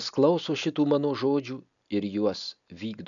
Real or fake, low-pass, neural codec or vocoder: real; 7.2 kHz; none